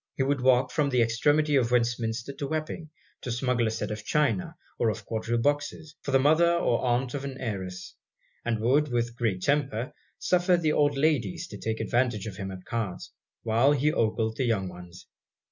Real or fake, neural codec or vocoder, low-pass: real; none; 7.2 kHz